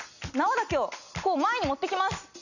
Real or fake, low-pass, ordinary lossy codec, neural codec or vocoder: real; 7.2 kHz; none; none